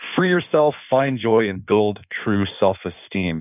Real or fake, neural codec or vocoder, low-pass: fake; codec, 16 kHz in and 24 kHz out, 1.1 kbps, FireRedTTS-2 codec; 3.6 kHz